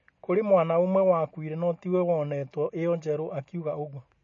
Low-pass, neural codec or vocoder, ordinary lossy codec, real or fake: 7.2 kHz; none; MP3, 32 kbps; real